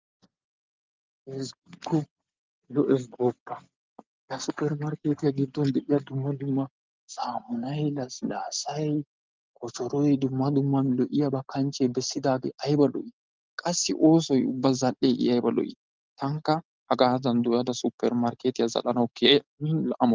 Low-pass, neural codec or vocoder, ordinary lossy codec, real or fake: 7.2 kHz; none; Opus, 24 kbps; real